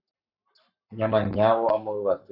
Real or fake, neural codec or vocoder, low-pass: fake; codec, 44.1 kHz, 7.8 kbps, Pupu-Codec; 5.4 kHz